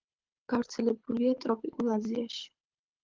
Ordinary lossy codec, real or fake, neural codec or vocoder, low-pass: Opus, 32 kbps; fake; codec, 24 kHz, 6 kbps, HILCodec; 7.2 kHz